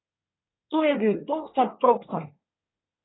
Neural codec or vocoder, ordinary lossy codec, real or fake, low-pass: codec, 24 kHz, 1 kbps, SNAC; AAC, 16 kbps; fake; 7.2 kHz